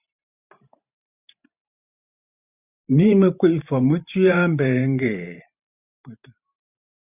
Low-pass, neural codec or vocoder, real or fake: 3.6 kHz; vocoder, 44.1 kHz, 128 mel bands every 512 samples, BigVGAN v2; fake